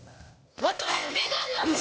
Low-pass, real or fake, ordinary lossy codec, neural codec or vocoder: none; fake; none; codec, 16 kHz, 0.8 kbps, ZipCodec